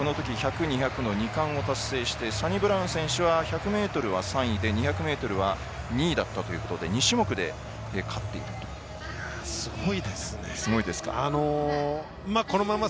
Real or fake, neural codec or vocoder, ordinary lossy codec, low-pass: real; none; none; none